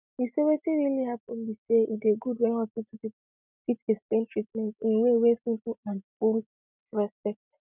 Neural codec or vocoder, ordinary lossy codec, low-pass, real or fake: none; none; 3.6 kHz; real